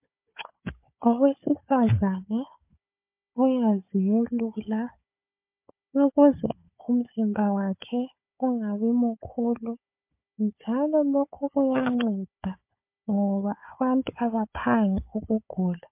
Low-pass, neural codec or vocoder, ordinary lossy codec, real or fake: 3.6 kHz; codec, 16 kHz, 4 kbps, FunCodec, trained on Chinese and English, 50 frames a second; MP3, 32 kbps; fake